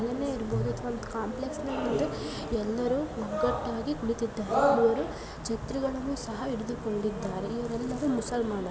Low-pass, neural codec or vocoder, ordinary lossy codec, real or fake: none; none; none; real